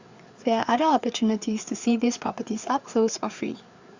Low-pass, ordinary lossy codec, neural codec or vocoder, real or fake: 7.2 kHz; Opus, 64 kbps; codec, 16 kHz, 4 kbps, FreqCodec, larger model; fake